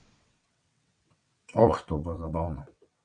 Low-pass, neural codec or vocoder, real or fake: 9.9 kHz; vocoder, 22.05 kHz, 80 mel bands, WaveNeXt; fake